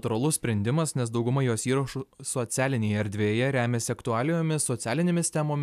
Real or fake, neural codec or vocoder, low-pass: real; none; 14.4 kHz